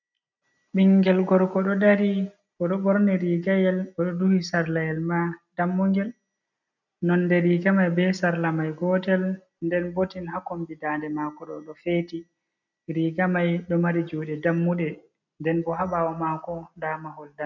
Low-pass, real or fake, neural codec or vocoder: 7.2 kHz; real; none